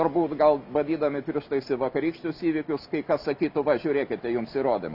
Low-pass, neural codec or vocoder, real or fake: 5.4 kHz; none; real